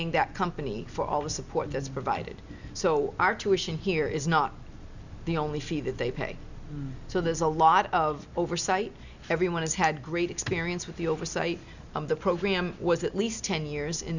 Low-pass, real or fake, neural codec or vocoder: 7.2 kHz; real; none